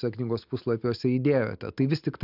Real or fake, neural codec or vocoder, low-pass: real; none; 5.4 kHz